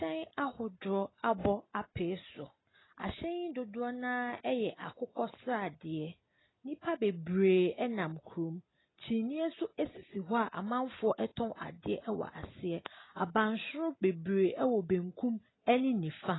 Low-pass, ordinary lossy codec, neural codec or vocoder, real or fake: 7.2 kHz; AAC, 16 kbps; none; real